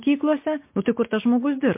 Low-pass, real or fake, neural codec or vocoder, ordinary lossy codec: 3.6 kHz; real; none; MP3, 24 kbps